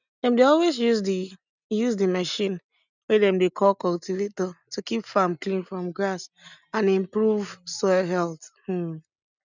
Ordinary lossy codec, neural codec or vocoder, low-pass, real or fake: none; none; 7.2 kHz; real